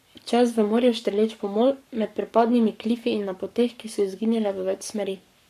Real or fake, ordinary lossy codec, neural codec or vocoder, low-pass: fake; none; codec, 44.1 kHz, 7.8 kbps, Pupu-Codec; 14.4 kHz